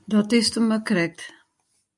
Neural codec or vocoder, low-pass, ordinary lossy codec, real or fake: none; 10.8 kHz; MP3, 96 kbps; real